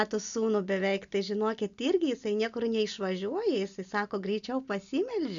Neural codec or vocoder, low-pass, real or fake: none; 7.2 kHz; real